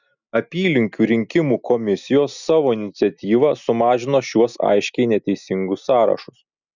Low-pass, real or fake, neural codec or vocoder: 7.2 kHz; real; none